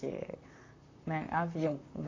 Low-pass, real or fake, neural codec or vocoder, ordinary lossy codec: 7.2 kHz; fake; vocoder, 44.1 kHz, 128 mel bands, Pupu-Vocoder; AAC, 32 kbps